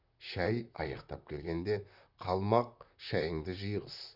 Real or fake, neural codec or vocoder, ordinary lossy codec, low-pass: fake; vocoder, 44.1 kHz, 128 mel bands, Pupu-Vocoder; none; 5.4 kHz